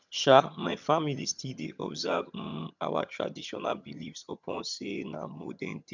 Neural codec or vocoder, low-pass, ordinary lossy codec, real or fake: vocoder, 22.05 kHz, 80 mel bands, HiFi-GAN; 7.2 kHz; none; fake